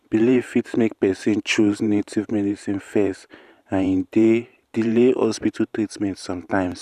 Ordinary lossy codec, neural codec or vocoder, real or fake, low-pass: none; vocoder, 44.1 kHz, 128 mel bands every 512 samples, BigVGAN v2; fake; 14.4 kHz